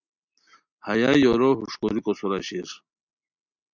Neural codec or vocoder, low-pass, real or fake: none; 7.2 kHz; real